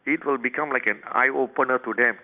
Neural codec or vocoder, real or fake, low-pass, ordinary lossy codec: none; real; 3.6 kHz; none